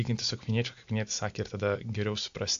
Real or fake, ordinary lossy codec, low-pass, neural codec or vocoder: real; AAC, 64 kbps; 7.2 kHz; none